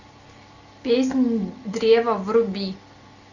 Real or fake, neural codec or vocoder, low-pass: real; none; 7.2 kHz